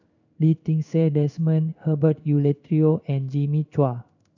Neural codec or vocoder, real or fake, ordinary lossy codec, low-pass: codec, 16 kHz in and 24 kHz out, 1 kbps, XY-Tokenizer; fake; none; 7.2 kHz